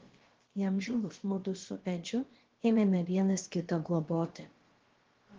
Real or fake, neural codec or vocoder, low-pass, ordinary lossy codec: fake; codec, 16 kHz, about 1 kbps, DyCAST, with the encoder's durations; 7.2 kHz; Opus, 16 kbps